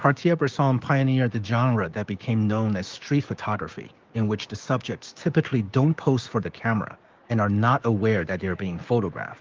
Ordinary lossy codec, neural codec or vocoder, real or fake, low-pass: Opus, 32 kbps; codec, 16 kHz, 6 kbps, DAC; fake; 7.2 kHz